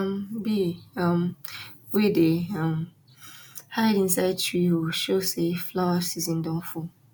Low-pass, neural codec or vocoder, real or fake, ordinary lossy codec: 19.8 kHz; none; real; none